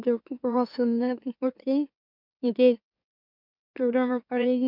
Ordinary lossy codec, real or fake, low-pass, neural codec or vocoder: none; fake; 5.4 kHz; autoencoder, 44.1 kHz, a latent of 192 numbers a frame, MeloTTS